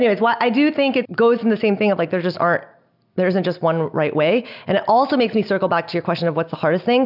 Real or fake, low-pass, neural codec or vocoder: real; 5.4 kHz; none